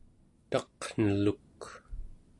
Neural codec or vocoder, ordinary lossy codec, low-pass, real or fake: none; AAC, 48 kbps; 10.8 kHz; real